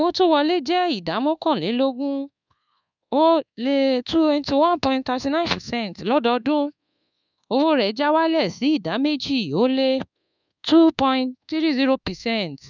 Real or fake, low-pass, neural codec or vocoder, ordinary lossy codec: fake; 7.2 kHz; codec, 24 kHz, 1.2 kbps, DualCodec; none